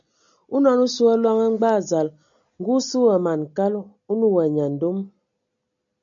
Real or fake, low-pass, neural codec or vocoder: real; 7.2 kHz; none